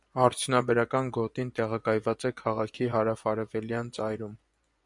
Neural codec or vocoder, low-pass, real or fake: vocoder, 24 kHz, 100 mel bands, Vocos; 10.8 kHz; fake